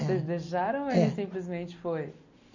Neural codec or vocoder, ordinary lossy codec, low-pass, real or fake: none; none; 7.2 kHz; real